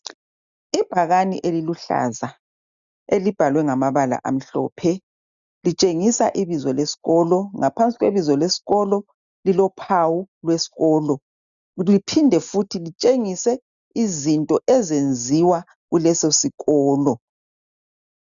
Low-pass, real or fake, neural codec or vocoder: 7.2 kHz; real; none